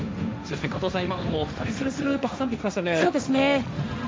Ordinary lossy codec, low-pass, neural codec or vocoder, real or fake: none; none; codec, 16 kHz, 1.1 kbps, Voila-Tokenizer; fake